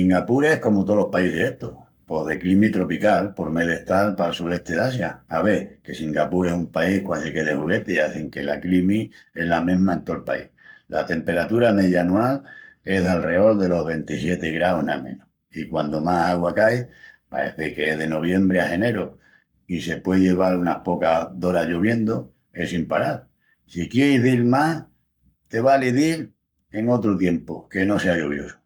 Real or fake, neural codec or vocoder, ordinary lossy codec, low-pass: fake; codec, 44.1 kHz, 7.8 kbps, Pupu-Codec; none; 19.8 kHz